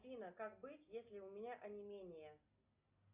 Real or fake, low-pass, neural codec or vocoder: real; 3.6 kHz; none